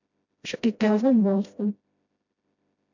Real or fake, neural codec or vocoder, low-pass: fake; codec, 16 kHz, 0.5 kbps, FreqCodec, smaller model; 7.2 kHz